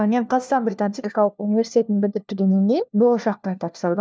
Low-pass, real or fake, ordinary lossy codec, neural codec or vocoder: none; fake; none; codec, 16 kHz, 1 kbps, FunCodec, trained on LibriTTS, 50 frames a second